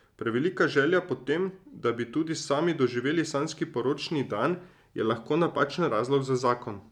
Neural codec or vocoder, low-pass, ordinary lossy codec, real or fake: none; 19.8 kHz; none; real